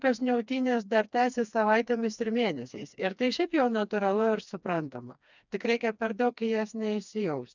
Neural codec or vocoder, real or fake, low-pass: codec, 16 kHz, 2 kbps, FreqCodec, smaller model; fake; 7.2 kHz